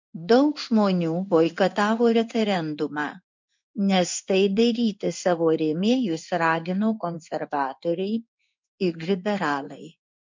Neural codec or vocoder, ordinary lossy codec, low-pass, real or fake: codec, 16 kHz in and 24 kHz out, 1 kbps, XY-Tokenizer; MP3, 64 kbps; 7.2 kHz; fake